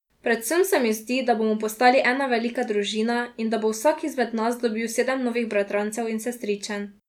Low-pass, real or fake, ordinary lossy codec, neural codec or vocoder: 19.8 kHz; real; none; none